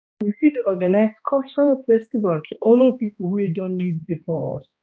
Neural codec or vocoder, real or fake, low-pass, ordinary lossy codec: codec, 16 kHz, 1 kbps, X-Codec, HuBERT features, trained on balanced general audio; fake; none; none